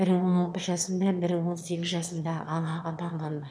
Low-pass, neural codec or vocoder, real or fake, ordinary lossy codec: none; autoencoder, 22.05 kHz, a latent of 192 numbers a frame, VITS, trained on one speaker; fake; none